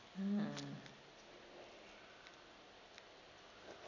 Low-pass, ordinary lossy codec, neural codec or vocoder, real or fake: 7.2 kHz; none; none; real